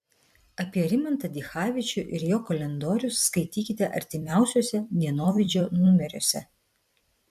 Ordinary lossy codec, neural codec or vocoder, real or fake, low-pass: MP3, 96 kbps; vocoder, 44.1 kHz, 128 mel bands every 256 samples, BigVGAN v2; fake; 14.4 kHz